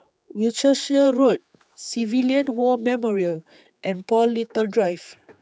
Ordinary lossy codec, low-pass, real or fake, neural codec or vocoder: none; none; fake; codec, 16 kHz, 4 kbps, X-Codec, HuBERT features, trained on general audio